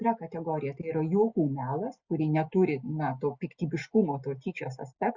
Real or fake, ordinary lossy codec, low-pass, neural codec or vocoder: real; Opus, 64 kbps; 7.2 kHz; none